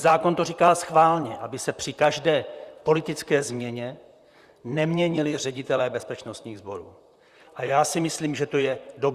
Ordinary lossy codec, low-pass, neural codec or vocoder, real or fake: Opus, 64 kbps; 14.4 kHz; vocoder, 44.1 kHz, 128 mel bands, Pupu-Vocoder; fake